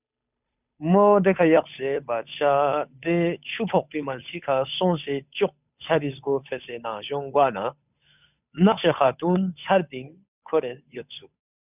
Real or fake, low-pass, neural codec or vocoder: fake; 3.6 kHz; codec, 16 kHz, 8 kbps, FunCodec, trained on Chinese and English, 25 frames a second